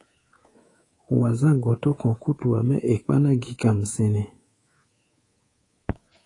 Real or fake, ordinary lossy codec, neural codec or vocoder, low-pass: fake; AAC, 32 kbps; codec, 24 kHz, 3.1 kbps, DualCodec; 10.8 kHz